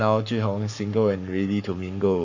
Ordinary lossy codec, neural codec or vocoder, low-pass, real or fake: none; codec, 44.1 kHz, 7.8 kbps, Pupu-Codec; 7.2 kHz; fake